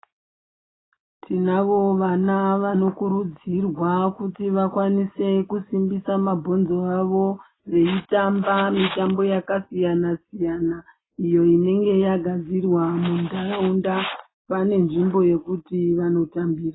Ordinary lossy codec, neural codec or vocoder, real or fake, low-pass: AAC, 16 kbps; none; real; 7.2 kHz